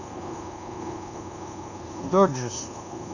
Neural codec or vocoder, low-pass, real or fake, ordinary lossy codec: codec, 24 kHz, 1.2 kbps, DualCodec; 7.2 kHz; fake; none